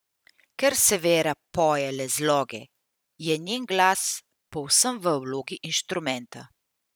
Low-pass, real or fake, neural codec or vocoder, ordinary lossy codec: none; fake; vocoder, 44.1 kHz, 128 mel bands every 512 samples, BigVGAN v2; none